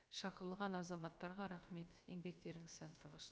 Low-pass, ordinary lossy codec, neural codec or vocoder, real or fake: none; none; codec, 16 kHz, about 1 kbps, DyCAST, with the encoder's durations; fake